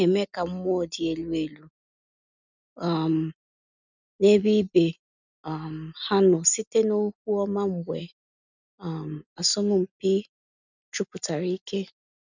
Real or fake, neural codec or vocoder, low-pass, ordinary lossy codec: real; none; 7.2 kHz; none